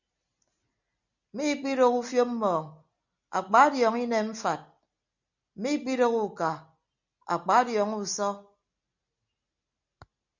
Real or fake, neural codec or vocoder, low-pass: real; none; 7.2 kHz